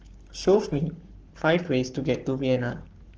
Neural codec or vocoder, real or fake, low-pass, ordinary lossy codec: codec, 44.1 kHz, 7.8 kbps, Pupu-Codec; fake; 7.2 kHz; Opus, 16 kbps